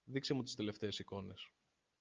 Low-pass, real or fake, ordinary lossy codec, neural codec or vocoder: 7.2 kHz; real; Opus, 24 kbps; none